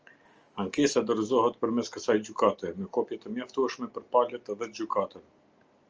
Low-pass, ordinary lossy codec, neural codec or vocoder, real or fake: 7.2 kHz; Opus, 24 kbps; none; real